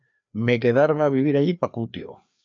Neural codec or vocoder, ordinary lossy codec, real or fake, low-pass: codec, 16 kHz, 2 kbps, FreqCodec, larger model; AAC, 64 kbps; fake; 7.2 kHz